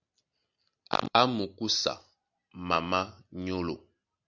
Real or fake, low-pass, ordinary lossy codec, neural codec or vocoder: real; 7.2 kHz; Opus, 64 kbps; none